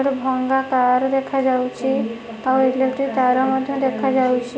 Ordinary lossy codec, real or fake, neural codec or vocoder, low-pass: none; real; none; none